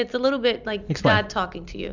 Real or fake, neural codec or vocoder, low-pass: real; none; 7.2 kHz